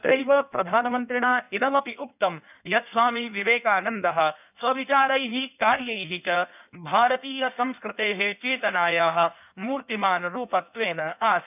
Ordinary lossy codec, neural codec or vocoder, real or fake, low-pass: none; codec, 16 kHz in and 24 kHz out, 1.1 kbps, FireRedTTS-2 codec; fake; 3.6 kHz